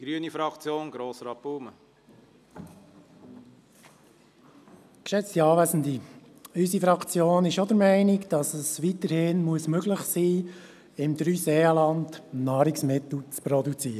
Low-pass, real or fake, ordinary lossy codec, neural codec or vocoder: 14.4 kHz; real; none; none